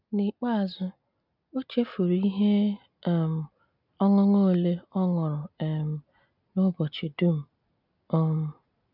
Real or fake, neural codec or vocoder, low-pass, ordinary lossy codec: real; none; 5.4 kHz; none